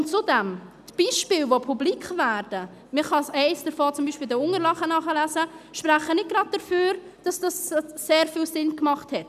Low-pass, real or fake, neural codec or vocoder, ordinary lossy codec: 14.4 kHz; real; none; none